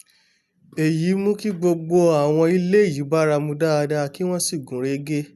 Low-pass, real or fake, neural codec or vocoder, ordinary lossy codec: 14.4 kHz; real; none; none